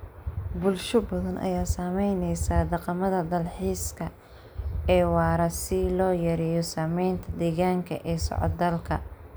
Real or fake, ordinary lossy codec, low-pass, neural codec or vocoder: real; none; none; none